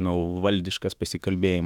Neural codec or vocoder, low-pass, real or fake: autoencoder, 48 kHz, 32 numbers a frame, DAC-VAE, trained on Japanese speech; 19.8 kHz; fake